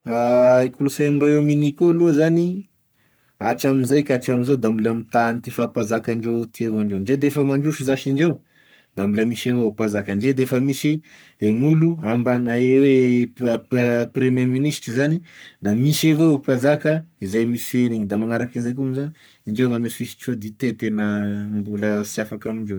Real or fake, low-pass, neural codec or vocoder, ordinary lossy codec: fake; none; codec, 44.1 kHz, 3.4 kbps, Pupu-Codec; none